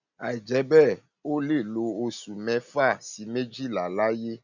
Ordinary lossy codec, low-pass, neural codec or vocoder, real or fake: none; 7.2 kHz; none; real